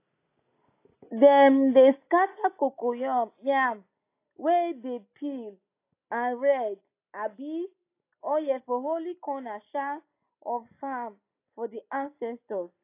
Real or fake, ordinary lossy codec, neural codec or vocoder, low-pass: real; AAC, 24 kbps; none; 3.6 kHz